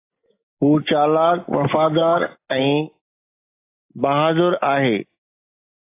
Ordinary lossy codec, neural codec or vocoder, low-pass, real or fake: AAC, 24 kbps; none; 3.6 kHz; real